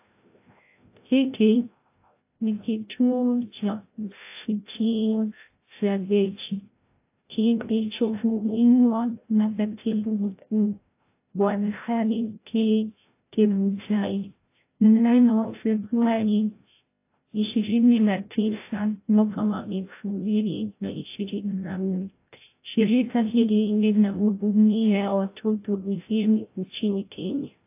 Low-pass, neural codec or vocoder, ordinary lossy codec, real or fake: 3.6 kHz; codec, 16 kHz, 0.5 kbps, FreqCodec, larger model; AAC, 24 kbps; fake